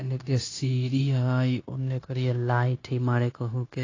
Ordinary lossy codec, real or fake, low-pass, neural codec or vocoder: AAC, 32 kbps; fake; 7.2 kHz; codec, 16 kHz, 0.9 kbps, LongCat-Audio-Codec